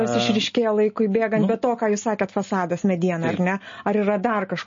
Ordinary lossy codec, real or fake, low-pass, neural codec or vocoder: MP3, 32 kbps; real; 7.2 kHz; none